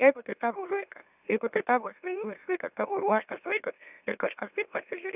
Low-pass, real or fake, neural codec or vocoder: 3.6 kHz; fake; autoencoder, 44.1 kHz, a latent of 192 numbers a frame, MeloTTS